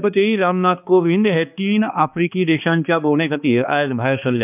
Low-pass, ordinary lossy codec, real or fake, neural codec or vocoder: 3.6 kHz; none; fake; codec, 16 kHz, 2 kbps, X-Codec, HuBERT features, trained on balanced general audio